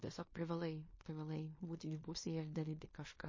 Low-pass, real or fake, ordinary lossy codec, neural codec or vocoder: 7.2 kHz; fake; MP3, 32 kbps; codec, 16 kHz in and 24 kHz out, 0.9 kbps, LongCat-Audio-Codec, fine tuned four codebook decoder